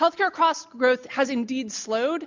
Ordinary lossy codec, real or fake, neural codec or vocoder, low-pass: MP3, 64 kbps; real; none; 7.2 kHz